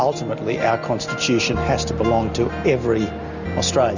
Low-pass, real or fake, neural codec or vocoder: 7.2 kHz; real; none